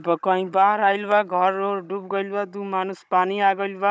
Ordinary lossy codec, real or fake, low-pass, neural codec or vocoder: none; fake; none; codec, 16 kHz, 16 kbps, FunCodec, trained on Chinese and English, 50 frames a second